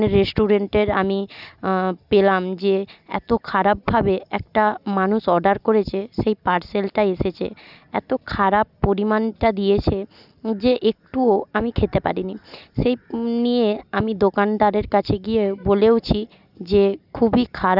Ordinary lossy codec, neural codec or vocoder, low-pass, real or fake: none; none; 5.4 kHz; real